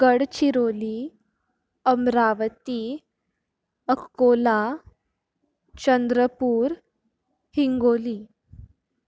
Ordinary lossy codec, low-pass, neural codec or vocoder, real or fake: none; none; none; real